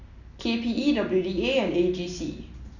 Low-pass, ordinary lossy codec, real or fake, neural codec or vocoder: 7.2 kHz; none; real; none